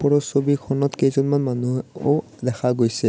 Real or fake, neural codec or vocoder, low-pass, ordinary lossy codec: real; none; none; none